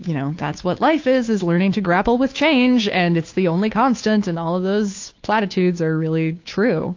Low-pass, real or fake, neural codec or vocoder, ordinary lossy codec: 7.2 kHz; fake; codec, 16 kHz, 2 kbps, FunCodec, trained on Chinese and English, 25 frames a second; AAC, 48 kbps